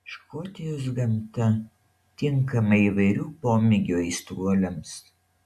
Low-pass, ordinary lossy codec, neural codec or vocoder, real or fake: 14.4 kHz; AAC, 96 kbps; none; real